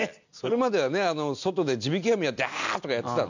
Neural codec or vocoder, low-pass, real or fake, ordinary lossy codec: none; 7.2 kHz; real; none